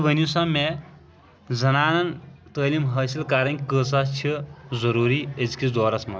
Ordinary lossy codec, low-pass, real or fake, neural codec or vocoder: none; none; real; none